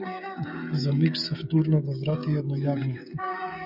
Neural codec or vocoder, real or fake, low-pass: codec, 16 kHz, 16 kbps, FreqCodec, smaller model; fake; 5.4 kHz